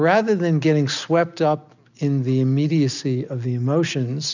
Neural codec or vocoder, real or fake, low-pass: none; real; 7.2 kHz